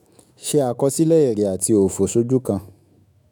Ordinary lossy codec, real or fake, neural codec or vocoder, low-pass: none; fake; autoencoder, 48 kHz, 128 numbers a frame, DAC-VAE, trained on Japanese speech; none